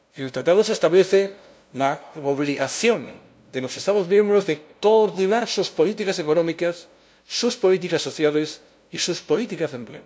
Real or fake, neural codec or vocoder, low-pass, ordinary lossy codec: fake; codec, 16 kHz, 0.5 kbps, FunCodec, trained on LibriTTS, 25 frames a second; none; none